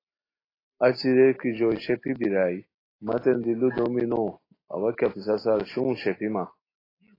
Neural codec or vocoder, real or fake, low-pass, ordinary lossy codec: none; real; 5.4 kHz; AAC, 24 kbps